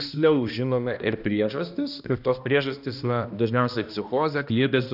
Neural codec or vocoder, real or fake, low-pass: codec, 16 kHz, 1 kbps, X-Codec, HuBERT features, trained on balanced general audio; fake; 5.4 kHz